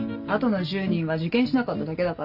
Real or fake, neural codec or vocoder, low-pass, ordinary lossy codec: real; none; 5.4 kHz; none